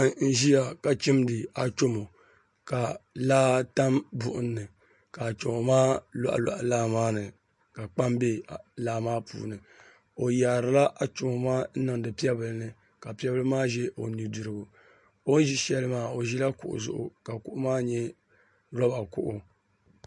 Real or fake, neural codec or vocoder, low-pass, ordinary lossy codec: real; none; 10.8 kHz; MP3, 48 kbps